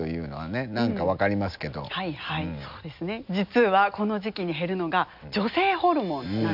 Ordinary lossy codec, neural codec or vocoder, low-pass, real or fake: none; none; 5.4 kHz; real